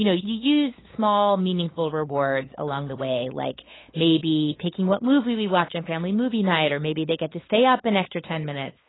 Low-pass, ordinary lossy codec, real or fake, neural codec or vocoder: 7.2 kHz; AAC, 16 kbps; fake; codec, 16 kHz, 8 kbps, FunCodec, trained on LibriTTS, 25 frames a second